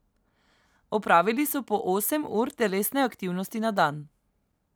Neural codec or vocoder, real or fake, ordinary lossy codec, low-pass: none; real; none; none